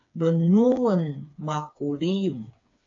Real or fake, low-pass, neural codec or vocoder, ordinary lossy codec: fake; 7.2 kHz; codec, 16 kHz, 4 kbps, FreqCodec, smaller model; AAC, 64 kbps